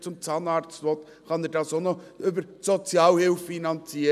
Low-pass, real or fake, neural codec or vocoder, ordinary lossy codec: 14.4 kHz; real; none; none